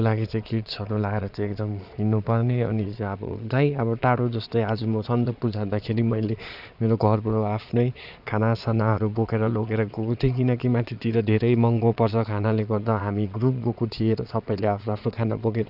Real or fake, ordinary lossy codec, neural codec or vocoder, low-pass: fake; none; vocoder, 22.05 kHz, 80 mel bands, Vocos; 5.4 kHz